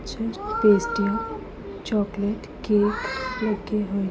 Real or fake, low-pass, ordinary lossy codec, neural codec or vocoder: real; none; none; none